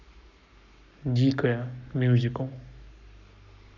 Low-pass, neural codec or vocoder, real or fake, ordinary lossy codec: 7.2 kHz; codec, 44.1 kHz, 7.8 kbps, Pupu-Codec; fake; none